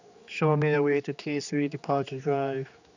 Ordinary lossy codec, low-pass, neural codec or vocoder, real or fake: none; 7.2 kHz; codec, 16 kHz, 2 kbps, X-Codec, HuBERT features, trained on general audio; fake